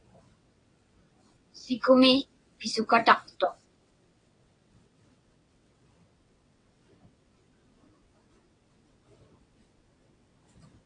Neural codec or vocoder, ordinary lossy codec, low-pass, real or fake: vocoder, 22.05 kHz, 80 mel bands, WaveNeXt; AAC, 48 kbps; 9.9 kHz; fake